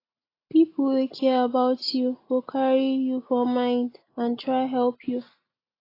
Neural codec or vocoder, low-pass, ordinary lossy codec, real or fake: none; 5.4 kHz; AAC, 24 kbps; real